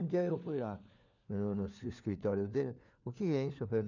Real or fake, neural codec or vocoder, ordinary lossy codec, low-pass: fake; codec, 16 kHz, 4 kbps, FunCodec, trained on LibriTTS, 50 frames a second; none; 7.2 kHz